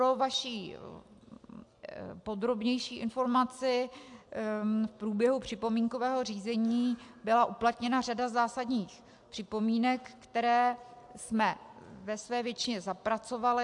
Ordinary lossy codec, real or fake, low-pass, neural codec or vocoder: AAC, 64 kbps; real; 10.8 kHz; none